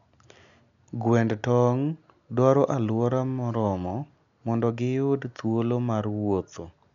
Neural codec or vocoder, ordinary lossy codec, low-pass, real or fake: none; none; 7.2 kHz; real